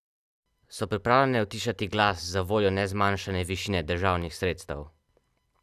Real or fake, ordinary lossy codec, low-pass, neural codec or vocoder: real; none; 14.4 kHz; none